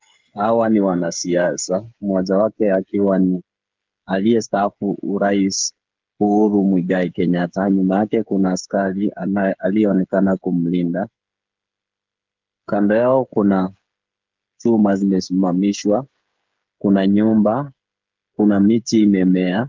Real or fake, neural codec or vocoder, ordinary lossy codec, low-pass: fake; codec, 16 kHz, 8 kbps, FreqCodec, smaller model; Opus, 24 kbps; 7.2 kHz